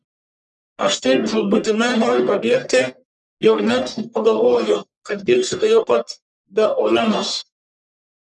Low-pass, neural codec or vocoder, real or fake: 10.8 kHz; codec, 44.1 kHz, 1.7 kbps, Pupu-Codec; fake